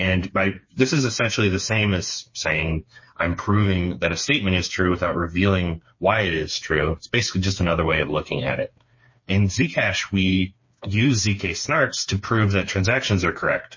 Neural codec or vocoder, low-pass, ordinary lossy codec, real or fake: codec, 16 kHz, 4 kbps, FreqCodec, smaller model; 7.2 kHz; MP3, 32 kbps; fake